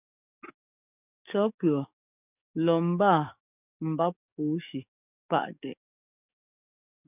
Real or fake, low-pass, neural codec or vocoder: fake; 3.6 kHz; codec, 44.1 kHz, 7.8 kbps, DAC